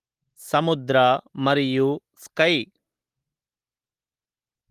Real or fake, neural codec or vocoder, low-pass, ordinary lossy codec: real; none; 14.4 kHz; Opus, 24 kbps